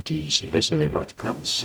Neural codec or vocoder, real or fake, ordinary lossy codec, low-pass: codec, 44.1 kHz, 0.9 kbps, DAC; fake; none; none